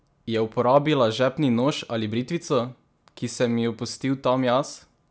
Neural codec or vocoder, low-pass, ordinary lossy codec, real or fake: none; none; none; real